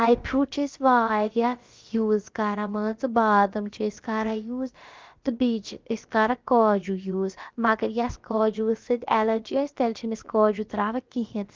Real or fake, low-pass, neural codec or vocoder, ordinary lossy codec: fake; 7.2 kHz; codec, 16 kHz, about 1 kbps, DyCAST, with the encoder's durations; Opus, 32 kbps